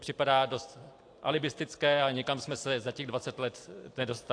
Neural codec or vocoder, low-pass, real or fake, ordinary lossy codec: none; 9.9 kHz; real; AAC, 48 kbps